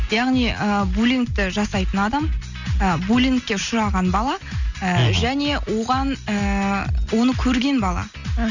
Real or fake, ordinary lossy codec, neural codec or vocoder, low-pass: real; none; none; 7.2 kHz